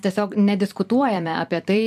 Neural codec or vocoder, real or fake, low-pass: vocoder, 44.1 kHz, 128 mel bands every 256 samples, BigVGAN v2; fake; 14.4 kHz